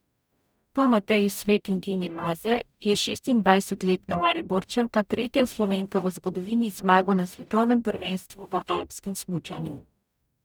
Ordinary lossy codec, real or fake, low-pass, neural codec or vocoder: none; fake; none; codec, 44.1 kHz, 0.9 kbps, DAC